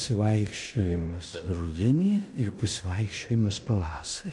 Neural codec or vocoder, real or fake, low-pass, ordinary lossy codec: codec, 16 kHz in and 24 kHz out, 0.9 kbps, LongCat-Audio-Codec, fine tuned four codebook decoder; fake; 10.8 kHz; MP3, 96 kbps